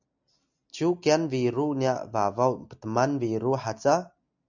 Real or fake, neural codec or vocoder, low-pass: real; none; 7.2 kHz